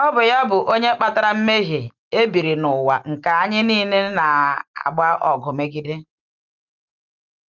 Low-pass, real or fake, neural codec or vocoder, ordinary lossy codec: 7.2 kHz; real; none; Opus, 24 kbps